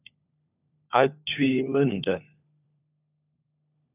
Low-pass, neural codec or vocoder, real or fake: 3.6 kHz; codec, 16 kHz, 4 kbps, FunCodec, trained on LibriTTS, 50 frames a second; fake